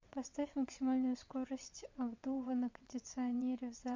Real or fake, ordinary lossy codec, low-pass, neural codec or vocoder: fake; MP3, 64 kbps; 7.2 kHz; vocoder, 22.05 kHz, 80 mel bands, WaveNeXt